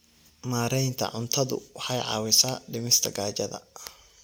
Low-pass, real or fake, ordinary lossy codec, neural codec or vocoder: none; real; none; none